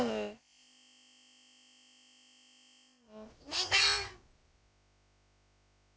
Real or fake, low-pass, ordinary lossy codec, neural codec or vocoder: fake; none; none; codec, 16 kHz, about 1 kbps, DyCAST, with the encoder's durations